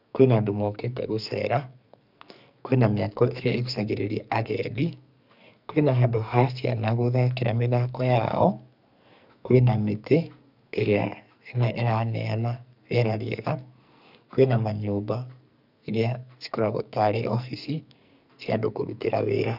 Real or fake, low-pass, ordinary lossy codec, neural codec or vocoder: fake; 5.4 kHz; none; codec, 44.1 kHz, 2.6 kbps, SNAC